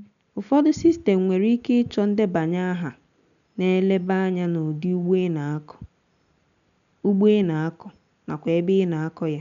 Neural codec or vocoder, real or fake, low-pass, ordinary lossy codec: none; real; 7.2 kHz; none